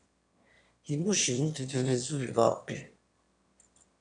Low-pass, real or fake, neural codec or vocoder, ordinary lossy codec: 9.9 kHz; fake; autoencoder, 22.05 kHz, a latent of 192 numbers a frame, VITS, trained on one speaker; MP3, 64 kbps